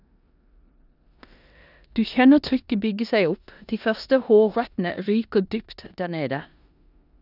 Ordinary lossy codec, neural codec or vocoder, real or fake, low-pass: none; codec, 16 kHz in and 24 kHz out, 0.9 kbps, LongCat-Audio-Codec, four codebook decoder; fake; 5.4 kHz